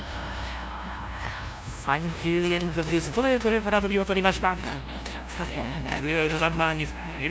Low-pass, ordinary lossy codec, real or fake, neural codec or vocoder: none; none; fake; codec, 16 kHz, 0.5 kbps, FunCodec, trained on LibriTTS, 25 frames a second